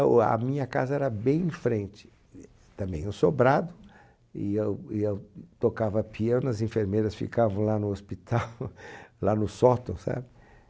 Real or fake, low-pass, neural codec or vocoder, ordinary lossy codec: real; none; none; none